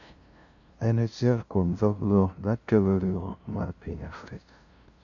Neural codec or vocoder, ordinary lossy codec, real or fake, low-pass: codec, 16 kHz, 0.5 kbps, FunCodec, trained on LibriTTS, 25 frames a second; none; fake; 7.2 kHz